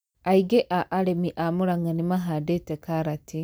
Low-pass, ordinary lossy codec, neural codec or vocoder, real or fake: none; none; none; real